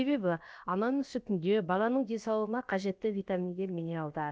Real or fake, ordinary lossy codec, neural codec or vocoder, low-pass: fake; none; codec, 16 kHz, about 1 kbps, DyCAST, with the encoder's durations; none